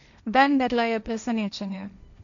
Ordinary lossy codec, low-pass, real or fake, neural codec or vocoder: none; 7.2 kHz; fake; codec, 16 kHz, 1.1 kbps, Voila-Tokenizer